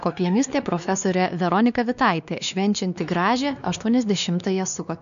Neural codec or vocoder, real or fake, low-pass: codec, 16 kHz, 2 kbps, X-Codec, WavLM features, trained on Multilingual LibriSpeech; fake; 7.2 kHz